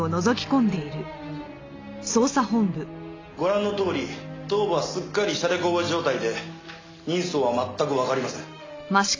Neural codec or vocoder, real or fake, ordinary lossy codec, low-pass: none; real; AAC, 32 kbps; 7.2 kHz